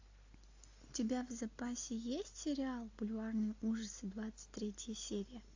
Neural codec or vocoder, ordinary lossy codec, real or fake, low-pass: none; AAC, 48 kbps; real; 7.2 kHz